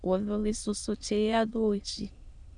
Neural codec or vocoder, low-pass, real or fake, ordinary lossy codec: autoencoder, 22.05 kHz, a latent of 192 numbers a frame, VITS, trained on many speakers; 9.9 kHz; fake; Opus, 64 kbps